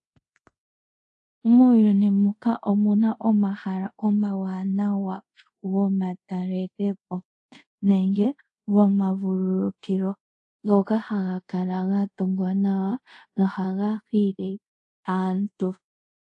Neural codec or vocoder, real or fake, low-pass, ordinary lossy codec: codec, 24 kHz, 0.5 kbps, DualCodec; fake; 10.8 kHz; AAC, 48 kbps